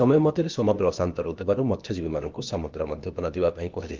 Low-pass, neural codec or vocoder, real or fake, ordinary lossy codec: 7.2 kHz; codec, 16 kHz, 0.8 kbps, ZipCodec; fake; Opus, 32 kbps